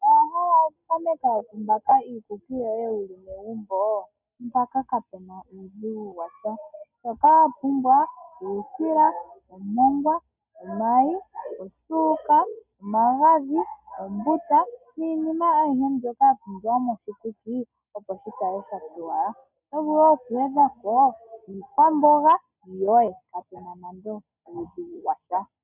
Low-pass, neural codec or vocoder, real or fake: 3.6 kHz; none; real